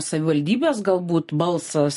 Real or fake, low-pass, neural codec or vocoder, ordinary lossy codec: real; 14.4 kHz; none; MP3, 48 kbps